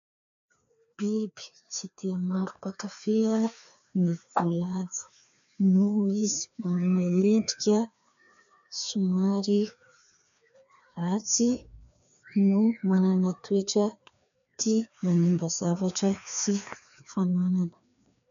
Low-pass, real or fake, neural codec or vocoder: 7.2 kHz; fake; codec, 16 kHz, 2 kbps, FreqCodec, larger model